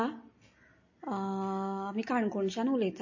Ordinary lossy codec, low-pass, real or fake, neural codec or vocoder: MP3, 32 kbps; 7.2 kHz; real; none